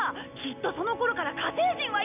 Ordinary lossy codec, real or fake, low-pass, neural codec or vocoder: none; real; 3.6 kHz; none